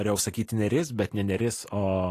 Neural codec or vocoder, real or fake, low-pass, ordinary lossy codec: none; real; 14.4 kHz; AAC, 48 kbps